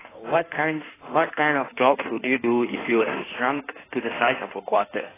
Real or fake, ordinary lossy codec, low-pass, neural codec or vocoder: fake; AAC, 16 kbps; 3.6 kHz; codec, 16 kHz in and 24 kHz out, 1.1 kbps, FireRedTTS-2 codec